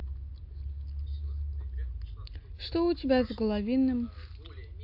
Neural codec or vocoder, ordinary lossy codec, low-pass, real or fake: none; AAC, 48 kbps; 5.4 kHz; real